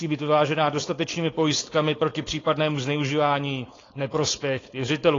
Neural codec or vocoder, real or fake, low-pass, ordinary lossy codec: codec, 16 kHz, 4.8 kbps, FACodec; fake; 7.2 kHz; AAC, 32 kbps